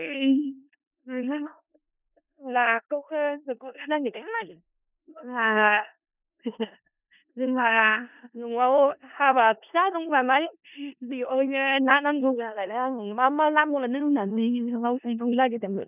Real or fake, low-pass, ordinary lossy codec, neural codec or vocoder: fake; 3.6 kHz; none; codec, 16 kHz in and 24 kHz out, 0.4 kbps, LongCat-Audio-Codec, four codebook decoder